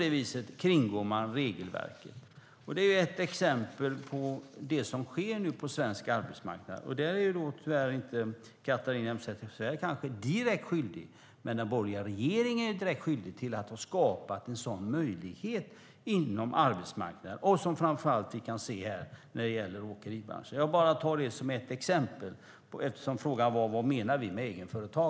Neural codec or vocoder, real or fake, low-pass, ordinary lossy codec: none; real; none; none